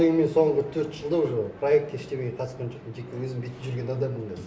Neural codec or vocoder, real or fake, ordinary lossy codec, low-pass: none; real; none; none